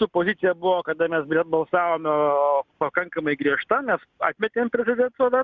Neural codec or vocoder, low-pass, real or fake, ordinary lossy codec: none; 7.2 kHz; real; Opus, 64 kbps